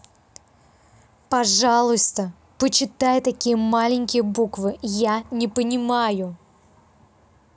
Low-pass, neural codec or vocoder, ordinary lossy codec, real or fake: none; none; none; real